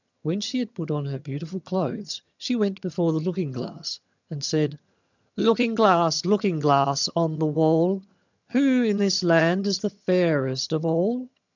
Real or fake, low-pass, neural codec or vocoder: fake; 7.2 kHz; vocoder, 22.05 kHz, 80 mel bands, HiFi-GAN